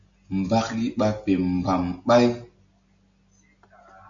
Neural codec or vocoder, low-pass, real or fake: none; 7.2 kHz; real